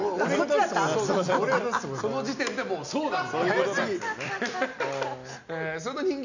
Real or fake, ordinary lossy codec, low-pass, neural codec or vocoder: real; none; 7.2 kHz; none